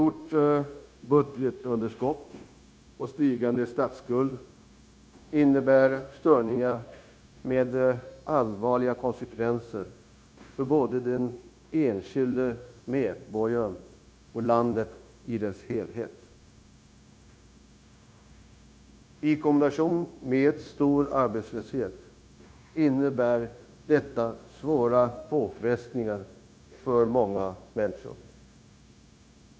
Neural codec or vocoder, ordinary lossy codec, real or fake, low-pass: codec, 16 kHz, 0.9 kbps, LongCat-Audio-Codec; none; fake; none